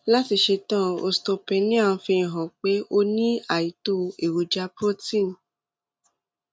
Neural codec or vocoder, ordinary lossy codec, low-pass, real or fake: none; none; none; real